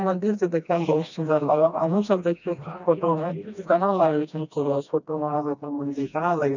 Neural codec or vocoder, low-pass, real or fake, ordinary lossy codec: codec, 16 kHz, 1 kbps, FreqCodec, smaller model; 7.2 kHz; fake; none